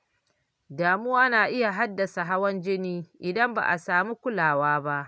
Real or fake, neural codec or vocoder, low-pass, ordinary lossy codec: real; none; none; none